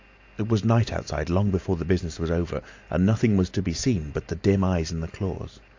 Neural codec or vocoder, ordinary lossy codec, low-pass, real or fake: none; AAC, 48 kbps; 7.2 kHz; real